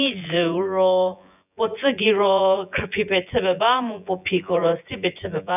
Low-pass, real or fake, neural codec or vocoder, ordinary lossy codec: 3.6 kHz; fake; vocoder, 24 kHz, 100 mel bands, Vocos; none